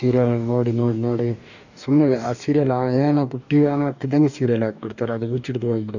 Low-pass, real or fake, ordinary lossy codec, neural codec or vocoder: 7.2 kHz; fake; none; codec, 44.1 kHz, 2.6 kbps, DAC